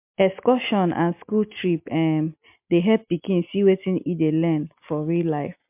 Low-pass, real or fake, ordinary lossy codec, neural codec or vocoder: 3.6 kHz; real; MP3, 32 kbps; none